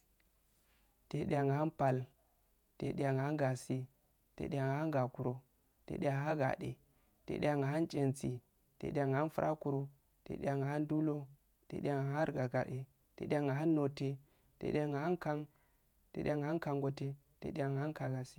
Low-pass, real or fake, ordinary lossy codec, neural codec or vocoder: 19.8 kHz; fake; none; vocoder, 48 kHz, 128 mel bands, Vocos